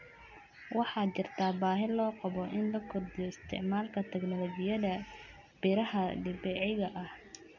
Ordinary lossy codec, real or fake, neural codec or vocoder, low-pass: none; real; none; 7.2 kHz